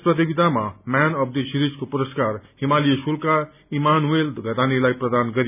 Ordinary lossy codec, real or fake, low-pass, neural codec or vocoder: none; real; 3.6 kHz; none